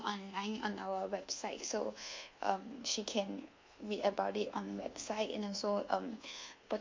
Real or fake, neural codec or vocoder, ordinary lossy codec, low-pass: fake; codec, 24 kHz, 1.2 kbps, DualCodec; MP3, 48 kbps; 7.2 kHz